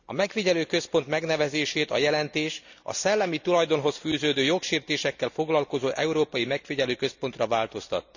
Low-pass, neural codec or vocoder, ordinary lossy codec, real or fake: 7.2 kHz; none; none; real